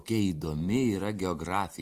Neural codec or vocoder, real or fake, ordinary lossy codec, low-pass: vocoder, 44.1 kHz, 128 mel bands every 512 samples, BigVGAN v2; fake; Opus, 24 kbps; 14.4 kHz